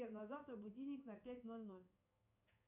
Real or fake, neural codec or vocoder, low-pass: fake; codec, 16 kHz in and 24 kHz out, 1 kbps, XY-Tokenizer; 3.6 kHz